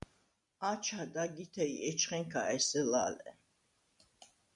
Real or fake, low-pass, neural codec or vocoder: real; 10.8 kHz; none